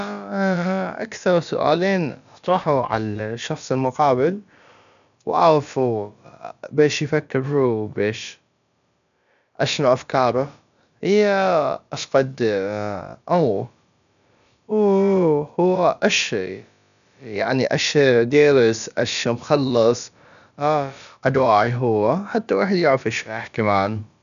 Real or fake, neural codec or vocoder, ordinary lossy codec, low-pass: fake; codec, 16 kHz, about 1 kbps, DyCAST, with the encoder's durations; none; 7.2 kHz